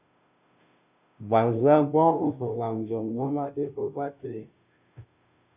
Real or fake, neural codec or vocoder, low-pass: fake; codec, 16 kHz, 0.5 kbps, FunCodec, trained on Chinese and English, 25 frames a second; 3.6 kHz